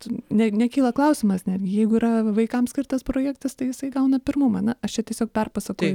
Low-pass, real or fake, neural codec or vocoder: 19.8 kHz; real; none